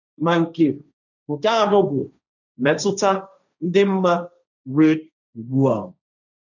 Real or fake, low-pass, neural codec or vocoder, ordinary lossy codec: fake; 7.2 kHz; codec, 16 kHz, 1.1 kbps, Voila-Tokenizer; none